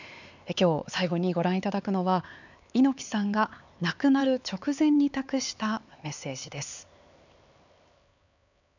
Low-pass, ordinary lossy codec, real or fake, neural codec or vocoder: 7.2 kHz; none; fake; codec, 16 kHz, 4 kbps, X-Codec, HuBERT features, trained on LibriSpeech